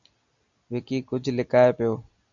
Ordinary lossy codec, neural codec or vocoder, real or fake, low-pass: MP3, 96 kbps; none; real; 7.2 kHz